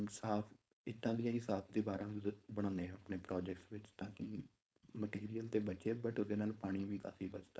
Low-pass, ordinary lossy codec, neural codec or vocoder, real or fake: none; none; codec, 16 kHz, 4.8 kbps, FACodec; fake